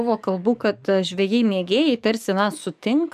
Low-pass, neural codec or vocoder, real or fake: 14.4 kHz; codec, 44.1 kHz, 7.8 kbps, DAC; fake